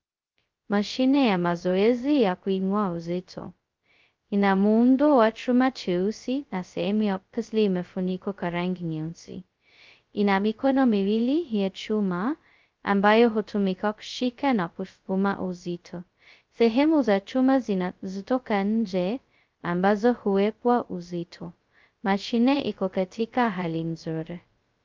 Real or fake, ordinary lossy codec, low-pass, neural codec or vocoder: fake; Opus, 24 kbps; 7.2 kHz; codec, 16 kHz, 0.2 kbps, FocalCodec